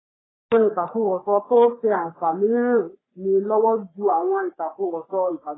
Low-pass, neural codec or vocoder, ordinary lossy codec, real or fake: 7.2 kHz; codec, 44.1 kHz, 3.4 kbps, Pupu-Codec; AAC, 16 kbps; fake